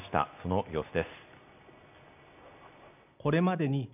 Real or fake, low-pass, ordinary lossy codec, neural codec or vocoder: real; 3.6 kHz; none; none